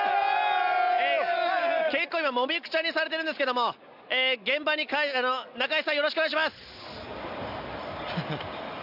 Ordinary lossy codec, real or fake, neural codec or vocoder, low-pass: none; real; none; 5.4 kHz